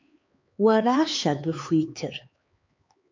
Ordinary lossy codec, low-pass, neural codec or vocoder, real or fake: MP3, 48 kbps; 7.2 kHz; codec, 16 kHz, 4 kbps, X-Codec, HuBERT features, trained on LibriSpeech; fake